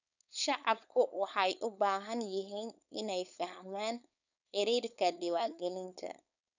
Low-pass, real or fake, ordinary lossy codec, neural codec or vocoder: 7.2 kHz; fake; none; codec, 16 kHz, 4.8 kbps, FACodec